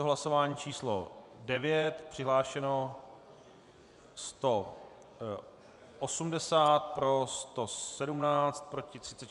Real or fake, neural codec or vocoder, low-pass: fake; vocoder, 24 kHz, 100 mel bands, Vocos; 10.8 kHz